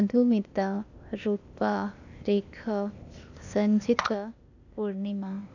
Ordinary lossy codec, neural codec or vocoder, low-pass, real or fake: none; codec, 16 kHz, 0.8 kbps, ZipCodec; 7.2 kHz; fake